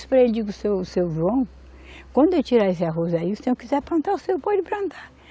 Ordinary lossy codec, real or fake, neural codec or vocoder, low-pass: none; real; none; none